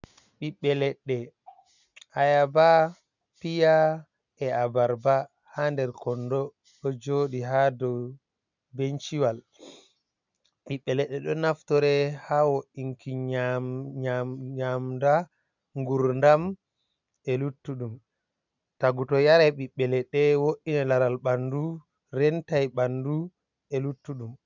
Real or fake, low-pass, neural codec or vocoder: real; 7.2 kHz; none